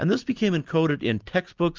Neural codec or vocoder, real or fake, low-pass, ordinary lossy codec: none; real; 7.2 kHz; Opus, 32 kbps